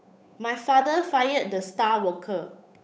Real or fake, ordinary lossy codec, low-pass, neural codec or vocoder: fake; none; none; codec, 16 kHz, 8 kbps, FunCodec, trained on Chinese and English, 25 frames a second